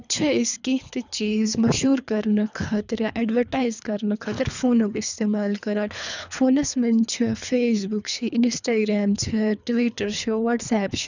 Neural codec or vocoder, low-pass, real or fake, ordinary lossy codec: codec, 24 kHz, 3 kbps, HILCodec; 7.2 kHz; fake; none